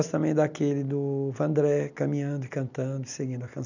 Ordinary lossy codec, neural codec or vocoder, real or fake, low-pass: none; none; real; 7.2 kHz